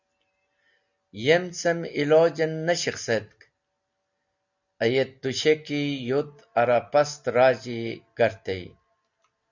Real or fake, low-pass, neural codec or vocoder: real; 7.2 kHz; none